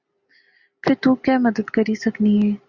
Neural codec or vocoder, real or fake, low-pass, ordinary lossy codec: none; real; 7.2 kHz; Opus, 64 kbps